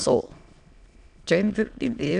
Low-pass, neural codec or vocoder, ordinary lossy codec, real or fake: 9.9 kHz; autoencoder, 22.05 kHz, a latent of 192 numbers a frame, VITS, trained on many speakers; none; fake